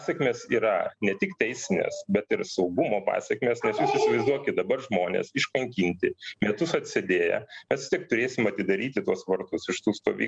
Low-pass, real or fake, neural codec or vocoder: 9.9 kHz; real; none